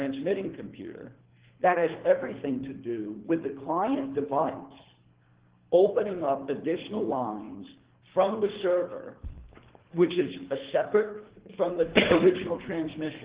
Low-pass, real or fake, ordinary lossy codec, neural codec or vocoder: 3.6 kHz; fake; Opus, 16 kbps; codec, 24 kHz, 3 kbps, HILCodec